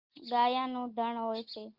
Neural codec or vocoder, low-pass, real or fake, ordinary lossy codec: none; 5.4 kHz; real; Opus, 24 kbps